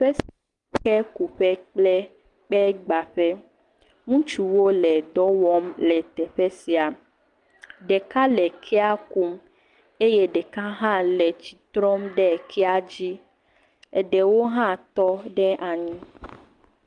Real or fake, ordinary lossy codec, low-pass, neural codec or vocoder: real; Opus, 32 kbps; 10.8 kHz; none